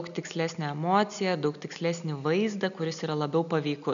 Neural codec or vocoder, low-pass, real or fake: none; 7.2 kHz; real